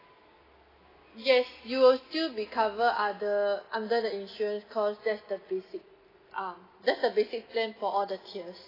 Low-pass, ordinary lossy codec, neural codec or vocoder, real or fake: 5.4 kHz; AAC, 24 kbps; none; real